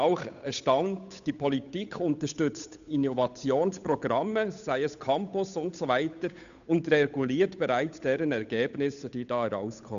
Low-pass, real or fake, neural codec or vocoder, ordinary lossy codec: 7.2 kHz; fake; codec, 16 kHz, 8 kbps, FunCodec, trained on Chinese and English, 25 frames a second; none